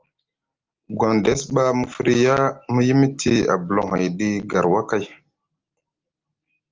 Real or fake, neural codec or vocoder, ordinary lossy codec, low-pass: real; none; Opus, 32 kbps; 7.2 kHz